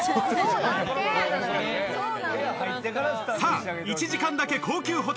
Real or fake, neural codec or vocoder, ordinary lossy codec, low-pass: real; none; none; none